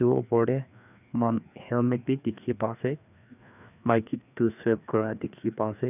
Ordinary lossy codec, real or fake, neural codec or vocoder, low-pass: none; fake; codec, 16 kHz, 2 kbps, FreqCodec, larger model; 3.6 kHz